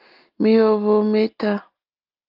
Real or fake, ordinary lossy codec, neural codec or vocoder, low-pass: real; Opus, 32 kbps; none; 5.4 kHz